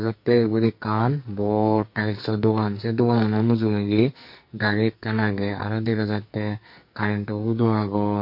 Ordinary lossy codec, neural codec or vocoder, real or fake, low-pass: MP3, 32 kbps; codec, 44.1 kHz, 2.6 kbps, SNAC; fake; 5.4 kHz